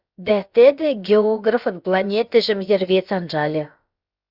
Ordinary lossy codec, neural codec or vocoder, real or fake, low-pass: Opus, 64 kbps; codec, 16 kHz, about 1 kbps, DyCAST, with the encoder's durations; fake; 5.4 kHz